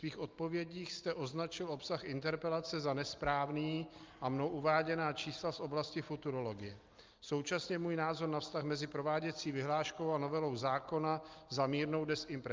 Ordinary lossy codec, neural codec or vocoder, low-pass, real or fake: Opus, 24 kbps; none; 7.2 kHz; real